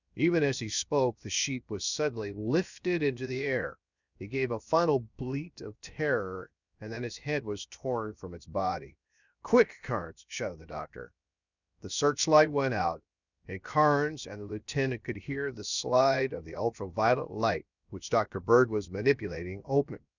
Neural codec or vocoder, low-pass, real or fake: codec, 16 kHz, 0.7 kbps, FocalCodec; 7.2 kHz; fake